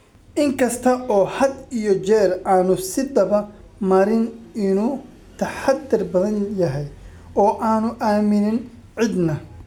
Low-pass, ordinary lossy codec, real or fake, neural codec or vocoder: 19.8 kHz; none; real; none